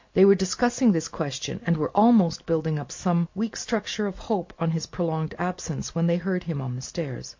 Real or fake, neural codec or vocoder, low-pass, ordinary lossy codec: real; none; 7.2 kHz; MP3, 48 kbps